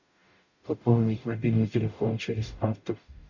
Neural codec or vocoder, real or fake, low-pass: codec, 44.1 kHz, 0.9 kbps, DAC; fake; 7.2 kHz